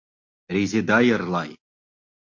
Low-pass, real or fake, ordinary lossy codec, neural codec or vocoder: 7.2 kHz; real; MP3, 48 kbps; none